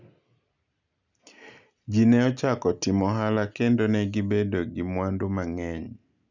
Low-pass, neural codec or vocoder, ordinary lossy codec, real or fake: 7.2 kHz; none; none; real